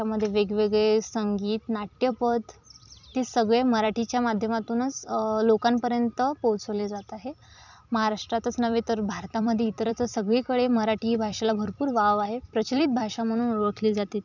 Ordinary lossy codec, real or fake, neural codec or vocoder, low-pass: none; fake; vocoder, 44.1 kHz, 128 mel bands every 256 samples, BigVGAN v2; 7.2 kHz